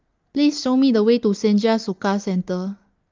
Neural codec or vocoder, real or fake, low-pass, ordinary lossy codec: none; real; 7.2 kHz; Opus, 32 kbps